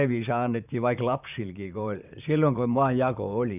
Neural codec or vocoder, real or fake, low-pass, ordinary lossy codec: vocoder, 44.1 kHz, 128 mel bands, Pupu-Vocoder; fake; 3.6 kHz; none